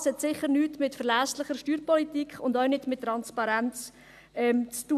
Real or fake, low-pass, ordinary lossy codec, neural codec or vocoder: real; 14.4 kHz; none; none